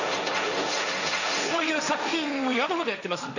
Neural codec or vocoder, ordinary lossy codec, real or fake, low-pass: codec, 16 kHz, 1.1 kbps, Voila-Tokenizer; none; fake; 7.2 kHz